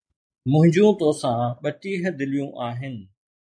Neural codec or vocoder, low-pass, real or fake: none; 9.9 kHz; real